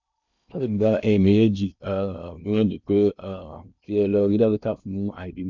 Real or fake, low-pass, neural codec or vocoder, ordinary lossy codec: fake; 7.2 kHz; codec, 16 kHz in and 24 kHz out, 0.8 kbps, FocalCodec, streaming, 65536 codes; none